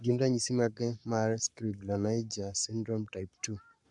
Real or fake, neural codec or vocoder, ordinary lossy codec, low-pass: fake; codec, 44.1 kHz, 7.8 kbps, Pupu-Codec; none; 10.8 kHz